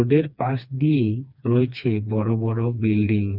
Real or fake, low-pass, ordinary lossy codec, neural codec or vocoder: fake; 5.4 kHz; none; codec, 16 kHz, 2 kbps, FreqCodec, smaller model